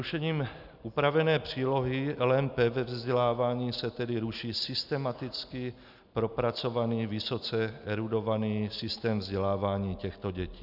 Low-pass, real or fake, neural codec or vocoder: 5.4 kHz; real; none